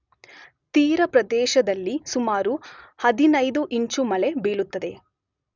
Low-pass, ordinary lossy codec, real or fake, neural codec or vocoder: 7.2 kHz; none; real; none